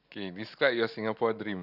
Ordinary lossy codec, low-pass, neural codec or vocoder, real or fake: none; 5.4 kHz; none; real